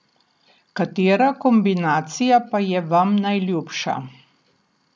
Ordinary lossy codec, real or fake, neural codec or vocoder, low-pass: none; real; none; none